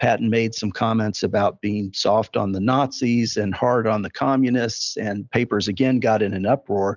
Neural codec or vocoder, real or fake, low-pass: none; real; 7.2 kHz